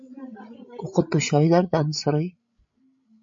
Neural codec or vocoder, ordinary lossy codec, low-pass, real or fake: codec, 16 kHz, 16 kbps, FreqCodec, larger model; MP3, 48 kbps; 7.2 kHz; fake